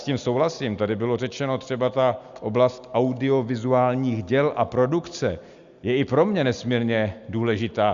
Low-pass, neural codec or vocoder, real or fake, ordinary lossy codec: 7.2 kHz; none; real; Opus, 64 kbps